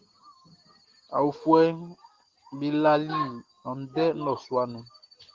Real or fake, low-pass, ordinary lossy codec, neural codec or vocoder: real; 7.2 kHz; Opus, 16 kbps; none